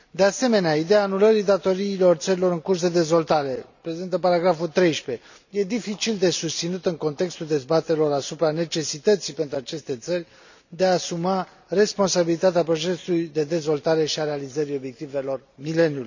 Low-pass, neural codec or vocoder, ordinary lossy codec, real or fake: 7.2 kHz; none; none; real